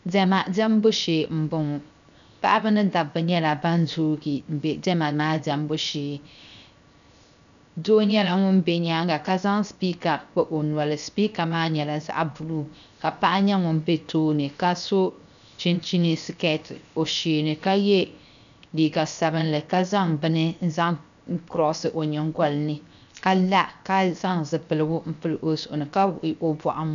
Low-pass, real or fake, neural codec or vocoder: 7.2 kHz; fake; codec, 16 kHz, 0.7 kbps, FocalCodec